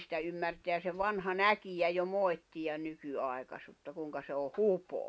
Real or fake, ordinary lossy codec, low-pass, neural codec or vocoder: real; none; none; none